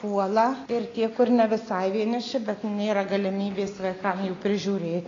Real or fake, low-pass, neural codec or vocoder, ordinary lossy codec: real; 7.2 kHz; none; AAC, 32 kbps